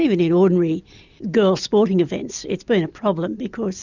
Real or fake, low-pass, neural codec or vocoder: real; 7.2 kHz; none